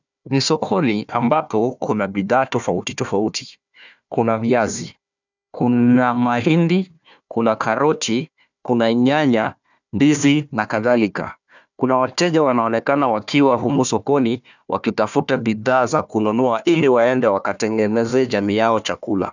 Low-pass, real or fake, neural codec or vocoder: 7.2 kHz; fake; codec, 16 kHz, 1 kbps, FunCodec, trained on Chinese and English, 50 frames a second